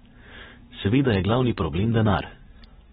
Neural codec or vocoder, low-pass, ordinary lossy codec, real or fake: vocoder, 44.1 kHz, 128 mel bands every 512 samples, BigVGAN v2; 19.8 kHz; AAC, 16 kbps; fake